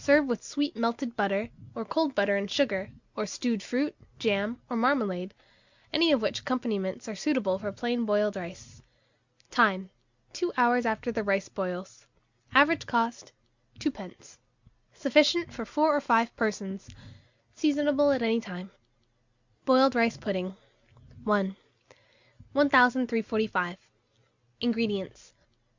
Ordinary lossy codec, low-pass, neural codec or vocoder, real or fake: Opus, 64 kbps; 7.2 kHz; none; real